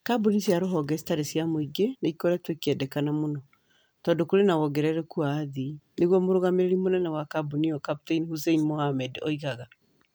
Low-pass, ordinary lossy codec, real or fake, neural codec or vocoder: none; none; real; none